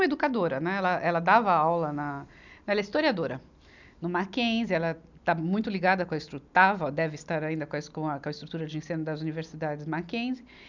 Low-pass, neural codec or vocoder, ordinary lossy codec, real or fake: 7.2 kHz; none; none; real